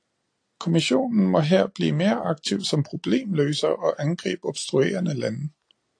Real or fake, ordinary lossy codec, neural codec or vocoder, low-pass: real; AAC, 48 kbps; none; 9.9 kHz